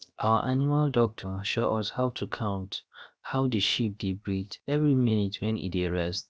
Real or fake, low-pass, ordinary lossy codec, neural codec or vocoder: fake; none; none; codec, 16 kHz, 0.7 kbps, FocalCodec